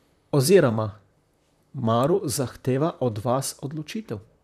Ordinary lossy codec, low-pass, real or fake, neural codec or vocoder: none; 14.4 kHz; fake; vocoder, 44.1 kHz, 128 mel bands, Pupu-Vocoder